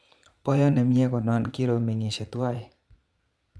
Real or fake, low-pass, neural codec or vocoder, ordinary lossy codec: fake; none; vocoder, 22.05 kHz, 80 mel bands, Vocos; none